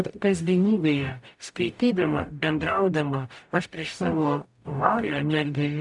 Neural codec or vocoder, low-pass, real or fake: codec, 44.1 kHz, 0.9 kbps, DAC; 10.8 kHz; fake